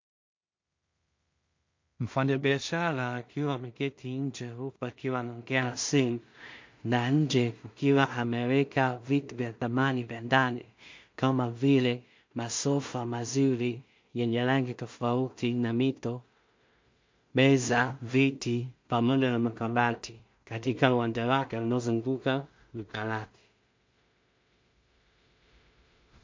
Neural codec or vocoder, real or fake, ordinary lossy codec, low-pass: codec, 16 kHz in and 24 kHz out, 0.4 kbps, LongCat-Audio-Codec, two codebook decoder; fake; MP3, 48 kbps; 7.2 kHz